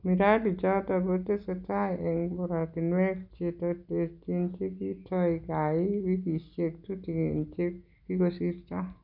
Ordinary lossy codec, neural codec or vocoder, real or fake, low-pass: none; none; real; 5.4 kHz